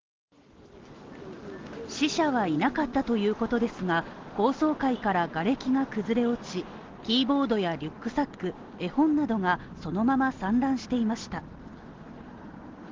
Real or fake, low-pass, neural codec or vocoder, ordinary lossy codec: real; 7.2 kHz; none; Opus, 16 kbps